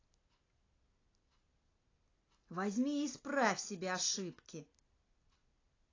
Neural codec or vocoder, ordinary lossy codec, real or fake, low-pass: none; AAC, 32 kbps; real; 7.2 kHz